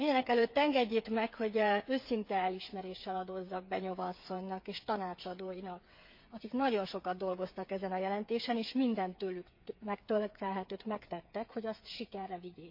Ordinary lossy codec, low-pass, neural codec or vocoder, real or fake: MP3, 32 kbps; 5.4 kHz; codec, 16 kHz, 8 kbps, FreqCodec, smaller model; fake